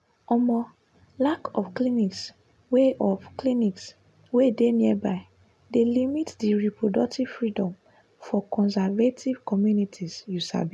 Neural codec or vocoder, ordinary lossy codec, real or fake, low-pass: none; none; real; none